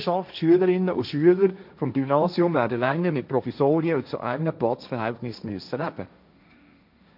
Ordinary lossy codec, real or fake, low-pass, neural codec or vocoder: none; fake; 5.4 kHz; codec, 16 kHz, 1.1 kbps, Voila-Tokenizer